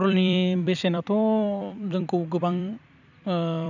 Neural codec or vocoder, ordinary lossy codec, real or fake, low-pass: vocoder, 44.1 kHz, 128 mel bands every 256 samples, BigVGAN v2; none; fake; 7.2 kHz